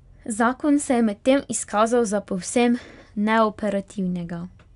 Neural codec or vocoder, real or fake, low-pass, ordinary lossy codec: none; real; 10.8 kHz; none